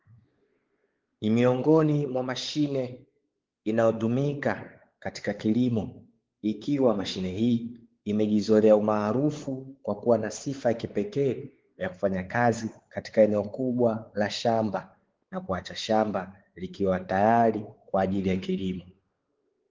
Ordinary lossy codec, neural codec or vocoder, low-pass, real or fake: Opus, 16 kbps; codec, 16 kHz, 4 kbps, X-Codec, WavLM features, trained on Multilingual LibriSpeech; 7.2 kHz; fake